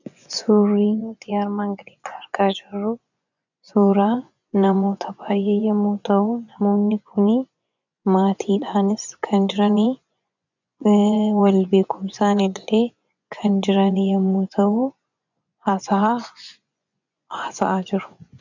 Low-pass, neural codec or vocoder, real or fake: 7.2 kHz; vocoder, 24 kHz, 100 mel bands, Vocos; fake